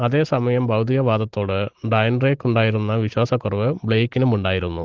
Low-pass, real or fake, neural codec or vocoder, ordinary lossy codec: 7.2 kHz; real; none; Opus, 16 kbps